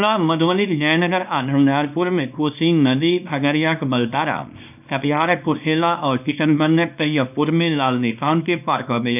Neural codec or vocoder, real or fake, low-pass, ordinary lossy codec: codec, 24 kHz, 0.9 kbps, WavTokenizer, small release; fake; 3.6 kHz; none